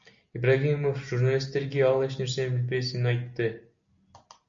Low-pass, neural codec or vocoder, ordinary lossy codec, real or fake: 7.2 kHz; none; MP3, 48 kbps; real